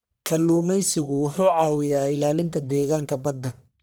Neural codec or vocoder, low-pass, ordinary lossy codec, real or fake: codec, 44.1 kHz, 1.7 kbps, Pupu-Codec; none; none; fake